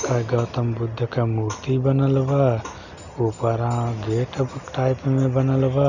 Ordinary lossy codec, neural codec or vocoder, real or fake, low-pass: none; none; real; 7.2 kHz